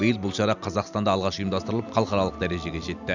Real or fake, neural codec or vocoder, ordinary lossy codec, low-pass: real; none; none; 7.2 kHz